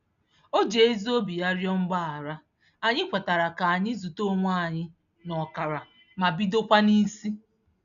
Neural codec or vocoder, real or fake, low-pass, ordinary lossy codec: none; real; 7.2 kHz; none